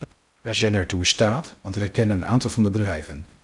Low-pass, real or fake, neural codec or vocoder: 10.8 kHz; fake; codec, 16 kHz in and 24 kHz out, 0.6 kbps, FocalCodec, streaming, 2048 codes